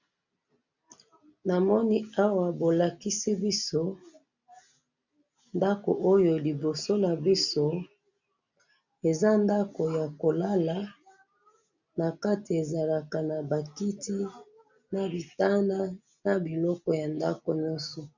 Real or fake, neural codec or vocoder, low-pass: real; none; 7.2 kHz